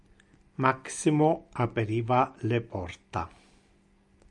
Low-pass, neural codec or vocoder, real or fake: 10.8 kHz; none; real